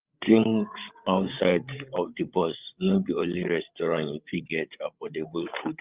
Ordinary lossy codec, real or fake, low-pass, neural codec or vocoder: Opus, 32 kbps; fake; 3.6 kHz; codec, 16 kHz, 8 kbps, FreqCodec, larger model